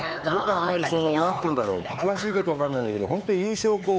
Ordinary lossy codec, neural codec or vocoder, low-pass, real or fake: none; codec, 16 kHz, 4 kbps, X-Codec, HuBERT features, trained on LibriSpeech; none; fake